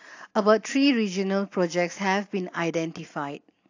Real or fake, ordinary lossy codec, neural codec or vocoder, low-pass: real; AAC, 48 kbps; none; 7.2 kHz